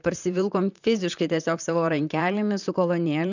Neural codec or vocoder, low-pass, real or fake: vocoder, 44.1 kHz, 128 mel bands, Pupu-Vocoder; 7.2 kHz; fake